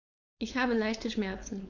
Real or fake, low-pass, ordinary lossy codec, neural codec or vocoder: fake; 7.2 kHz; none; codec, 16 kHz, 4.8 kbps, FACodec